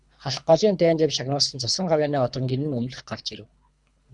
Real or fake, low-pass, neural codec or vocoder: fake; 10.8 kHz; codec, 24 kHz, 3 kbps, HILCodec